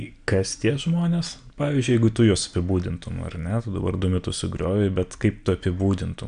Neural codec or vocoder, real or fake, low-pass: none; real; 9.9 kHz